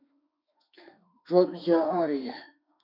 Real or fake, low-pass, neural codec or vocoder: fake; 5.4 kHz; autoencoder, 48 kHz, 32 numbers a frame, DAC-VAE, trained on Japanese speech